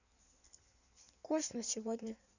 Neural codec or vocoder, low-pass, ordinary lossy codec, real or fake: codec, 16 kHz in and 24 kHz out, 1.1 kbps, FireRedTTS-2 codec; 7.2 kHz; none; fake